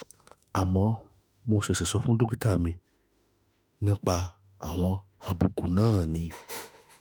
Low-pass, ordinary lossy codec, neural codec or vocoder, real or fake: none; none; autoencoder, 48 kHz, 32 numbers a frame, DAC-VAE, trained on Japanese speech; fake